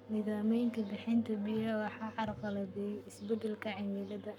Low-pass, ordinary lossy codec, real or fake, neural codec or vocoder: 19.8 kHz; none; fake; codec, 44.1 kHz, 7.8 kbps, Pupu-Codec